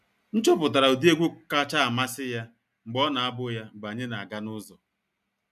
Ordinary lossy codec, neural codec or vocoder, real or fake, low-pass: none; none; real; 14.4 kHz